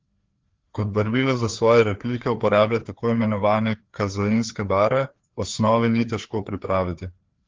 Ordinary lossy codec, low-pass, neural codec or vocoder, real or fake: Opus, 16 kbps; 7.2 kHz; codec, 16 kHz, 2 kbps, FreqCodec, larger model; fake